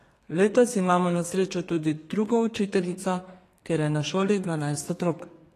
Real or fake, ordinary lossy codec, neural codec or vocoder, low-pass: fake; AAC, 48 kbps; codec, 32 kHz, 1.9 kbps, SNAC; 14.4 kHz